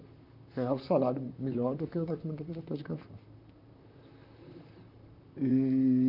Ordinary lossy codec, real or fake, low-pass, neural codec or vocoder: none; fake; 5.4 kHz; vocoder, 44.1 kHz, 128 mel bands, Pupu-Vocoder